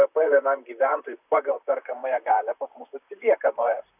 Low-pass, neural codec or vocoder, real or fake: 3.6 kHz; vocoder, 44.1 kHz, 128 mel bands, Pupu-Vocoder; fake